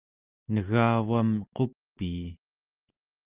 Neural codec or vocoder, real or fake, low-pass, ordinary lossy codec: none; real; 3.6 kHz; Opus, 32 kbps